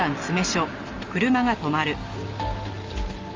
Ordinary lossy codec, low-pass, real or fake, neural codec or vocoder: Opus, 32 kbps; 7.2 kHz; real; none